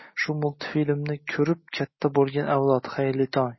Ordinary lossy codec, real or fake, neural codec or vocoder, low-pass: MP3, 24 kbps; real; none; 7.2 kHz